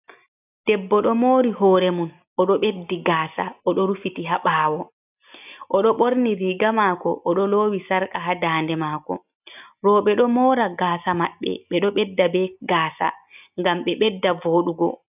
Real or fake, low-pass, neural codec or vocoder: real; 3.6 kHz; none